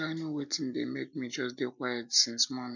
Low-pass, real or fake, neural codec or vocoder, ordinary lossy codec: 7.2 kHz; real; none; none